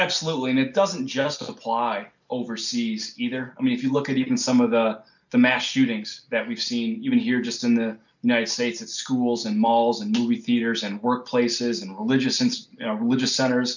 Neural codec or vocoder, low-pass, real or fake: none; 7.2 kHz; real